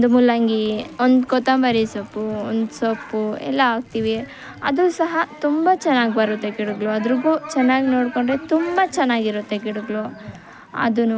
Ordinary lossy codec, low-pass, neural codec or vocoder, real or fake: none; none; none; real